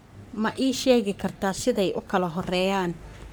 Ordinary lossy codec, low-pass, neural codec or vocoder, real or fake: none; none; codec, 44.1 kHz, 3.4 kbps, Pupu-Codec; fake